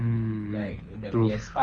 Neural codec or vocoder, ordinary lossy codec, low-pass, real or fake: codec, 24 kHz, 6 kbps, HILCodec; Opus, 32 kbps; 9.9 kHz; fake